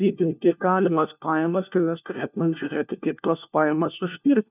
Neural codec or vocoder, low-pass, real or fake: codec, 16 kHz, 1 kbps, FunCodec, trained on LibriTTS, 50 frames a second; 3.6 kHz; fake